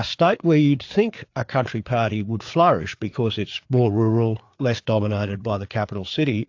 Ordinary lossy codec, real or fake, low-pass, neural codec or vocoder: AAC, 48 kbps; fake; 7.2 kHz; codec, 16 kHz, 4 kbps, FreqCodec, larger model